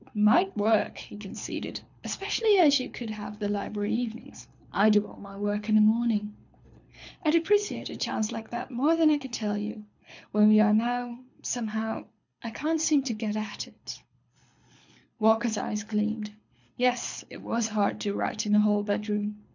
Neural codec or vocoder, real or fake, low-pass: codec, 24 kHz, 6 kbps, HILCodec; fake; 7.2 kHz